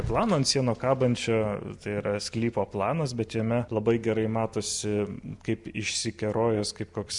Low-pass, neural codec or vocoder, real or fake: 10.8 kHz; none; real